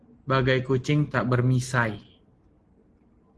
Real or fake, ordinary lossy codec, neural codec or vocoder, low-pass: real; Opus, 16 kbps; none; 10.8 kHz